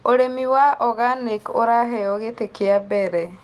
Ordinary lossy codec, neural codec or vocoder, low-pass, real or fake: Opus, 24 kbps; none; 14.4 kHz; real